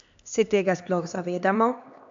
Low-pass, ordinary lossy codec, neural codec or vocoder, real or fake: 7.2 kHz; AAC, 64 kbps; codec, 16 kHz, 2 kbps, X-Codec, HuBERT features, trained on LibriSpeech; fake